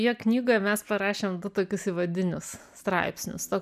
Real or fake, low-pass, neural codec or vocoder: real; 14.4 kHz; none